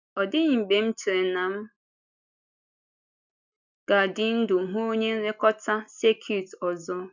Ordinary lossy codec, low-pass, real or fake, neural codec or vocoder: none; 7.2 kHz; real; none